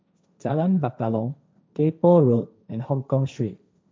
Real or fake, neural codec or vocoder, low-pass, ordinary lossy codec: fake; codec, 16 kHz, 1.1 kbps, Voila-Tokenizer; none; none